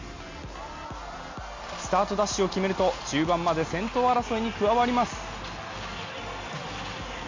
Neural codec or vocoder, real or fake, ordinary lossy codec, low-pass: none; real; MP3, 48 kbps; 7.2 kHz